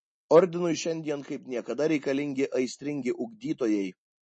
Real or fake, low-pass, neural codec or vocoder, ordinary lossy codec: real; 10.8 kHz; none; MP3, 32 kbps